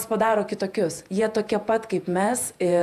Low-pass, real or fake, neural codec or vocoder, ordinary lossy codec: 14.4 kHz; fake; vocoder, 48 kHz, 128 mel bands, Vocos; AAC, 96 kbps